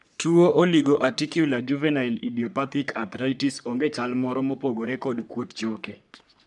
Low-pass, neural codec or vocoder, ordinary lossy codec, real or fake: 10.8 kHz; codec, 44.1 kHz, 3.4 kbps, Pupu-Codec; none; fake